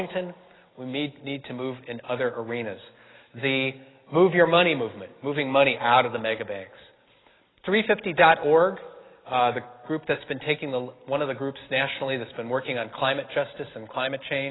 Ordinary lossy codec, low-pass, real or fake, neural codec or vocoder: AAC, 16 kbps; 7.2 kHz; real; none